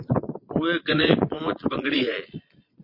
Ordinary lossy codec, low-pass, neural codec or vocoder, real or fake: MP3, 32 kbps; 5.4 kHz; vocoder, 22.05 kHz, 80 mel bands, Vocos; fake